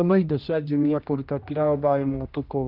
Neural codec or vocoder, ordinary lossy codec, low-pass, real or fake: codec, 16 kHz, 1 kbps, X-Codec, HuBERT features, trained on general audio; Opus, 24 kbps; 5.4 kHz; fake